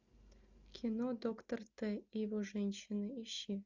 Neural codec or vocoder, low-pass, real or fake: none; 7.2 kHz; real